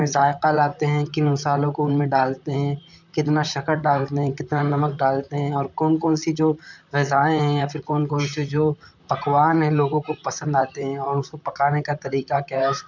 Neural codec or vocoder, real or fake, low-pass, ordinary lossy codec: vocoder, 44.1 kHz, 128 mel bands, Pupu-Vocoder; fake; 7.2 kHz; none